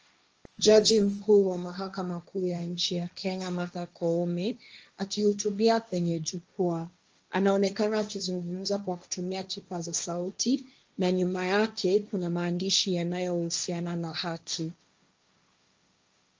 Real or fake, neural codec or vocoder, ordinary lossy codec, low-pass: fake; codec, 16 kHz, 1.1 kbps, Voila-Tokenizer; Opus, 24 kbps; 7.2 kHz